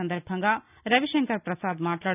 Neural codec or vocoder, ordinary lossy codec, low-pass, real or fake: none; none; 3.6 kHz; real